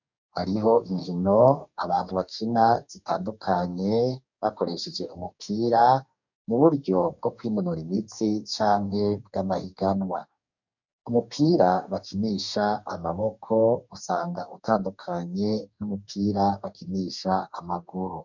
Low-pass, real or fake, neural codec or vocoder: 7.2 kHz; fake; codec, 44.1 kHz, 2.6 kbps, DAC